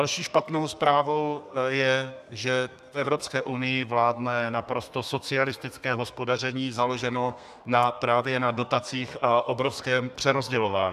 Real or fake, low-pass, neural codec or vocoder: fake; 14.4 kHz; codec, 32 kHz, 1.9 kbps, SNAC